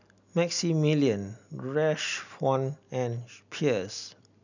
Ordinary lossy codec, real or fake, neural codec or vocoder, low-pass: none; real; none; 7.2 kHz